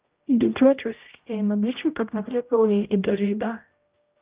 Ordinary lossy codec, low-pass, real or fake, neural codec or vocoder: Opus, 24 kbps; 3.6 kHz; fake; codec, 16 kHz, 0.5 kbps, X-Codec, HuBERT features, trained on general audio